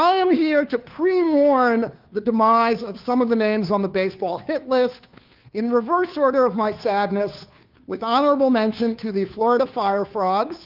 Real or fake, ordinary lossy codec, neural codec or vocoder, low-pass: fake; Opus, 32 kbps; codec, 16 kHz, 2 kbps, FunCodec, trained on Chinese and English, 25 frames a second; 5.4 kHz